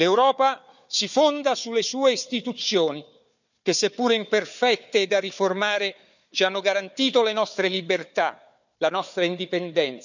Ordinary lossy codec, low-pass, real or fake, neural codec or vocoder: none; 7.2 kHz; fake; codec, 16 kHz, 4 kbps, FunCodec, trained on Chinese and English, 50 frames a second